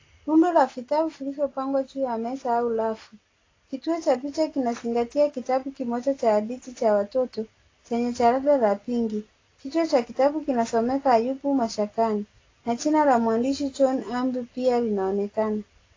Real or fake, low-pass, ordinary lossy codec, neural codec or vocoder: real; 7.2 kHz; AAC, 32 kbps; none